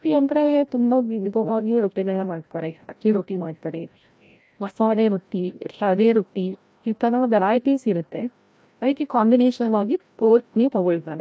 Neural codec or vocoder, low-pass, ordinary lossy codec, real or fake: codec, 16 kHz, 0.5 kbps, FreqCodec, larger model; none; none; fake